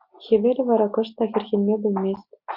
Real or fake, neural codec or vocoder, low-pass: real; none; 5.4 kHz